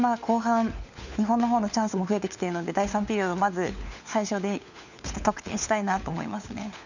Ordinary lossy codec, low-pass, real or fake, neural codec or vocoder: none; 7.2 kHz; fake; codec, 16 kHz, 4 kbps, FunCodec, trained on LibriTTS, 50 frames a second